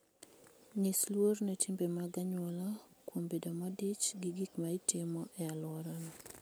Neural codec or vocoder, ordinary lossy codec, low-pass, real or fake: none; none; none; real